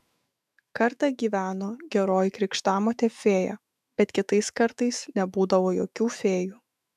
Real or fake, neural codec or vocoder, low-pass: fake; autoencoder, 48 kHz, 128 numbers a frame, DAC-VAE, trained on Japanese speech; 14.4 kHz